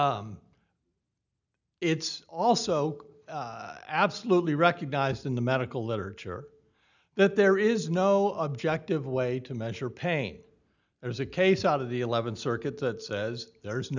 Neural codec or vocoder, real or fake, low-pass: none; real; 7.2 kHz